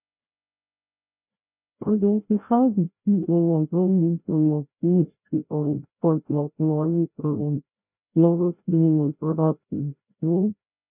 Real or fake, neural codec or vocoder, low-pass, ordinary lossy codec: fake; codec, 16 kHz, 0.5 kbps, FreqCodec, larger model; 3.6 kHz; none